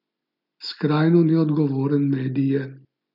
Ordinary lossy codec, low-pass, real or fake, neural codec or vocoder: none; 5.4 kHz; real; none